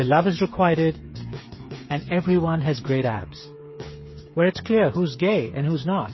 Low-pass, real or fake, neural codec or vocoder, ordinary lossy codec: 7.2 kHz; fake; codec, 16 kHz, 8 kbps, FreqCodec, smaller model; MP3, 24 kbps